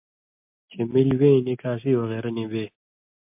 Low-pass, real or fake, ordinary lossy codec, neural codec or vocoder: 3.6 kHz; real; MP3, 32 kbps; none